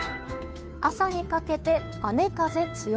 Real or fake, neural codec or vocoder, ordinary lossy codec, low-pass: fake; codec, 16 kHz, 2 kbps, FunCodec, trained on Chinese and English, 25 frames a second; none; none